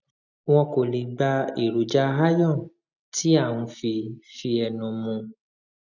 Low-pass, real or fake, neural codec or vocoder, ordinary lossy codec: 7.2 kHz; real; none; none